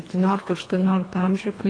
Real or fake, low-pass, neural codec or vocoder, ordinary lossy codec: fake; 9.9 kHz; codec, 24 kHz, 1.5 kbps, HILCodec; AAC, 32 kbps